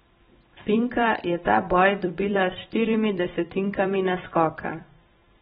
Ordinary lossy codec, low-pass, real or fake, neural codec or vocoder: AAC, 16 kbps; 19.8 kHz; fake; vocoder, 44.1 kHz, 128 mel bands every 256 samples, BigVGAN v2